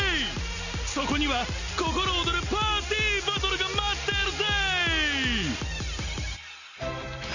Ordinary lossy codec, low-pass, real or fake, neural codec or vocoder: none; 7.2 kHz; real; none